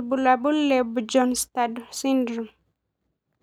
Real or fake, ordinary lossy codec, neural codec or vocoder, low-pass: real; none; none; 19.8 kHz